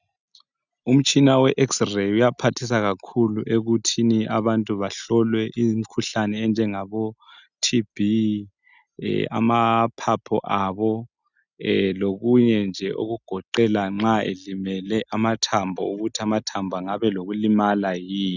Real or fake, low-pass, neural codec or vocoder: real; 7.2 kHz; none